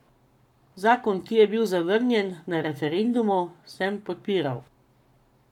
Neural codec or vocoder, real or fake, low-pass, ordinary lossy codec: codec, 44.1 kHz, 7.8 kbps, Pupu-Codec; fake; 19.8 kHz; none